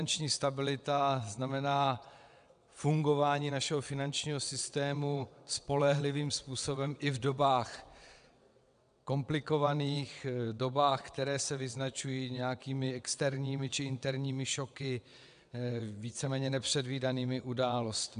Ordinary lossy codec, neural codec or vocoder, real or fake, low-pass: AAC, 96 kbps; vocoder, 22.05 kHz, 80 mel bands, WaveNeXt; fake; 9.9 kHz